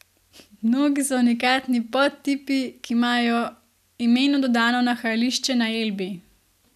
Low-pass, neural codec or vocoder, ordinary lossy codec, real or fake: 14.4 kHz; none; none; real